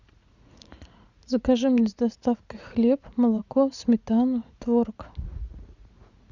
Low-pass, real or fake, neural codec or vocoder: 7.2 kHz; fake; vocoder, 44.1 kHz, 80 mel bands, Vocos